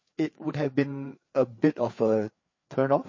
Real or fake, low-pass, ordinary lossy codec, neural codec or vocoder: fake; 7.2 kHz; MP3, 32 kbps; codec, 16 kHz, 4 kbps, FreqCodec, larger model